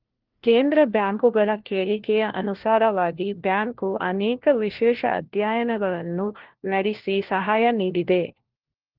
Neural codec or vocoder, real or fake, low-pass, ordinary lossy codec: codec, 16 kHz, 1 kbps, FunCodec, trained on LibriTTS, 50 frames a second; fake; 5.4 kHz; Opus, 16 kbps